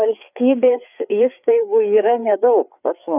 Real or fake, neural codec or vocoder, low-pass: fake; codec, 16 kHz, 4 kbps, FreqCodec, larger model; 3.6 kHz